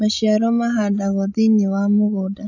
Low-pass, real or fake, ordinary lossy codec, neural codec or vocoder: 7.2 kHz; fake; AAC, 48 kbps; codec, 16 kHz, 16 kbps, FreqCodec, larger model